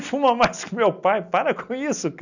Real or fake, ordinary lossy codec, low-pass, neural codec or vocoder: real; none; 7.2 kHz; none